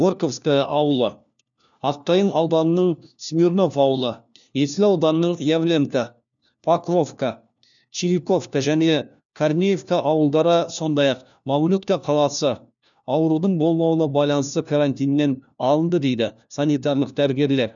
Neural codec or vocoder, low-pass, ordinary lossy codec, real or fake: codec, 16 kHz, 1 kbps, FunCodec, trained on LibriTTS, 50 frames a second; 7.2 kHz; none; fake